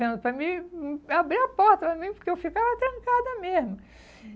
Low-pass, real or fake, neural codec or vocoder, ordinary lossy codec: none; real; none; none